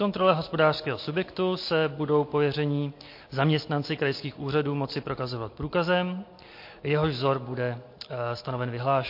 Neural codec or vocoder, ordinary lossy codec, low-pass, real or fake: none; MP3, 32 kbps; 5.4 kHz; real